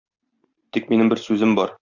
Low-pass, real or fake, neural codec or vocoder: 7.2 kHz; real; none